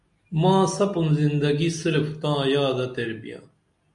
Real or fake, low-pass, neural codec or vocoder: real; 10.8 kHz; none